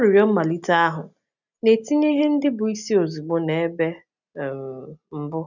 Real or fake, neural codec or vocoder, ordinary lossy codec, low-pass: real; none; none; 7.2 kHz